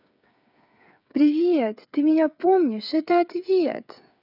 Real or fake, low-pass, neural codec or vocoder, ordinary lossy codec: fake; 5.4 kHz; codec, 16 kHz, 8 kbps, FreqCodec, smaller model; none